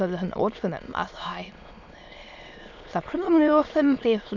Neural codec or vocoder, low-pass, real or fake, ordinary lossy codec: autoencoder, 22.05 kHz, a latent of 192 numbers a frame, VITS, trained on many speakers; 7.2 kHz; fake; none